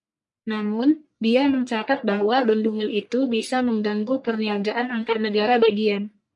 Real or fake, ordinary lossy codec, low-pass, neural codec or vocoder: fake; MP3, 64 kbps; 10.8 kHz; codec, 44.1 kHz, 1.7 kbps, Pupu-Codec